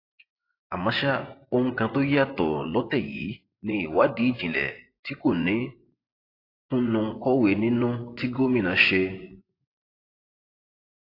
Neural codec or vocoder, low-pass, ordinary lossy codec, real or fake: vocoder, 24 kHz, 100 mel bands, Vocos; 5.4 kHz; AAC, 32 kbps; fake